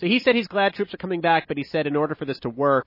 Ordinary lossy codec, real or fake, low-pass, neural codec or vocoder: MP3, 24 kbps; real; 5.4 kHz; none